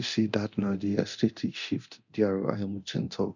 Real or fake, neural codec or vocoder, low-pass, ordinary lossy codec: fake; codec, 24 kHz, 0.9 kbps, DualCodec; 7.2 kHz; none